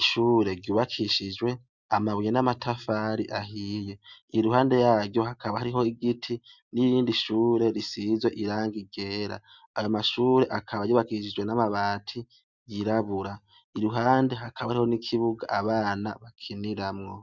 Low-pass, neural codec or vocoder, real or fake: 7.2 kHz; none; real